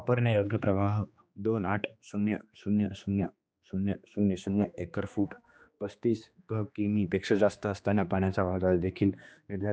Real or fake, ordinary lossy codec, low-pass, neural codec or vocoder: fake; none; none; codec, 16 kHz, 2 kbps, X-Codec, HuBERT features, trained on general audio